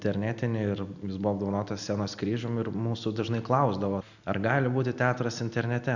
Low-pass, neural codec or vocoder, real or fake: 7.2 kHz; none; real